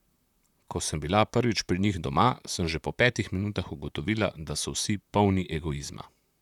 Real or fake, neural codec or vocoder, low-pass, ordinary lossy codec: fake; vocoder, 44.1 kHz, 128 mel bands, Pupu-Vocoder; 19.8 kHz; none